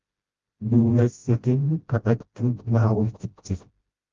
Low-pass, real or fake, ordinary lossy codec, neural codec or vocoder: 7.2 kHz; fake; Opus, 32 kbps; codec, 16 kHz, 0.5 kbps, FreqCodec, smaller model